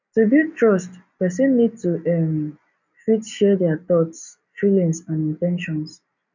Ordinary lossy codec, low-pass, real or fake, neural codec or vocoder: none; 7.2 kHz; real; none